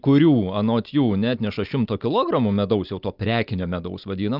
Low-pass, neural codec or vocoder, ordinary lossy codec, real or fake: 5.4 kHz; none; Opus, 24 kbps; real